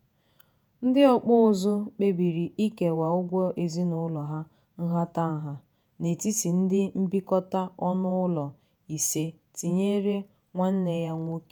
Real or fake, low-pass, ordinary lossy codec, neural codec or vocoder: fake; none; none; vocoder, 48 kHz, 128 mel bands, Vocos